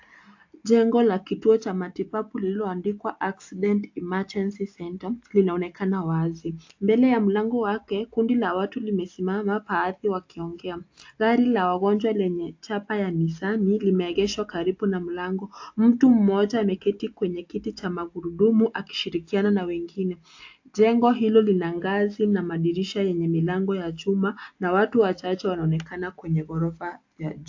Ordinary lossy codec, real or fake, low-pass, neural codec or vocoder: AAC, 48 kbps; real; 7.2 kHz; none